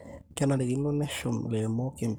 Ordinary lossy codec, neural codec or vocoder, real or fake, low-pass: none; codec, 44.1 kHz, 7.8 kbps, Pupu-Codec; fake; none